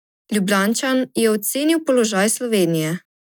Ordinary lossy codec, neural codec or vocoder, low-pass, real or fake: none; none; none; real